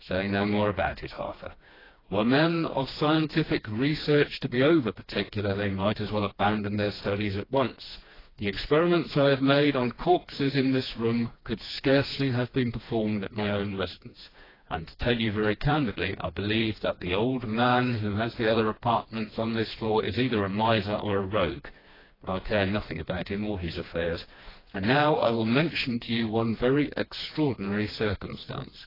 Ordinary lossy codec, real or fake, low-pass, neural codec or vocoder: AAC, 24 kbps; fake; 5.4 kHz; codec, 16 kHz, 2 kbps, FreqCodec, smaller model